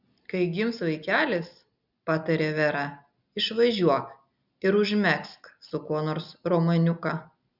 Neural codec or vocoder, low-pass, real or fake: none; 5.4 kHz; real